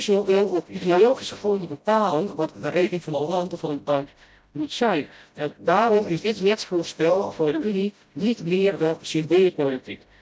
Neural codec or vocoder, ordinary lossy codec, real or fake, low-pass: codec, 16 kHz, 0.5 kbps, FreqCodec, smaller model; none; fake; none